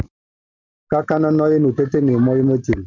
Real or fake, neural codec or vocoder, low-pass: real; none; 7.2 kHz